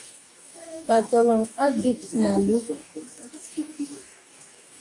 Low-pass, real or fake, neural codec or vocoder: 10.8 kHz; fake; codec, 44.1 kHz, 2.6 kbps, DAC